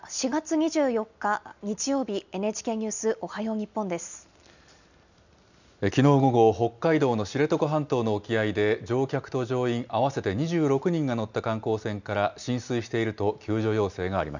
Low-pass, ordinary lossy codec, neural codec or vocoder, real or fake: 7.2 kHz; none; none; real